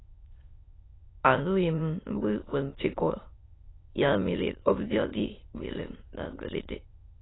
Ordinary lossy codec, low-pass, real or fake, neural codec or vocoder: AAC, 16 kbps; 7.2 kHz; fake; autoencoder, 22.05 kHz, a latent of 192 numbers a frame, VITS, trained on many speakers